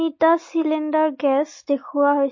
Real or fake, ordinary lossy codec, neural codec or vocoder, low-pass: real; MP3, 32 kbps; none; 7.2 kHz